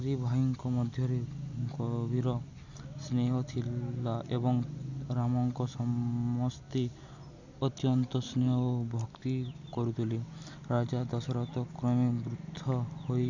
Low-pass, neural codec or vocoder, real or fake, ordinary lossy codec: 7.2 kHz; none; real; none